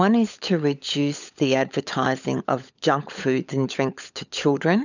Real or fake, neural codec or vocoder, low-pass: fake; codec, 16 kHz, 16 kbps, FunCodec, trained on LibriTTS, 50 frames a second; 7.2 kHz